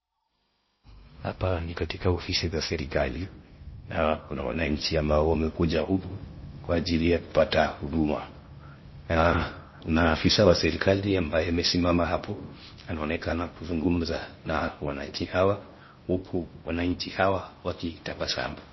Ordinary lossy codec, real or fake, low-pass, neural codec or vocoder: MP3, 24 kbps; fake; 7.2 kHz; codec, 16 kHz in and 24 kHz out, 0.6 kbps, FocalCodec, streaming, 2048 codes